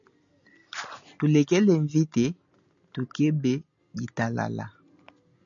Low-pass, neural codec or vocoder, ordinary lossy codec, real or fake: 7.2 kHz; none; MP3, 96 kbps; real